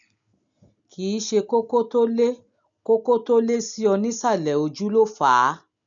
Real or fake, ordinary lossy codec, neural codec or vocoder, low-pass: real; none; none; 7.2 kHz